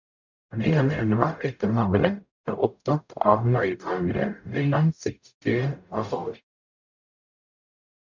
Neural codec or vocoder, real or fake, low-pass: codec, 44.1 kHz, 0.9 kbps, DAC; fake; 7.2 kHz